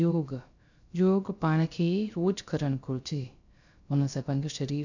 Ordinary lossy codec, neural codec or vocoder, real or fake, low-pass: none; codec, 16 kHz, 0.3 kbps, FocalCodec; fake; 7.2 kHz